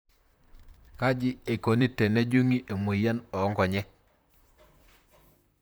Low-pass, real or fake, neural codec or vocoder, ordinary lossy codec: none; fake; vocoder, 44.1 kHz, 128 mel bands, Pupu-Vocoder; none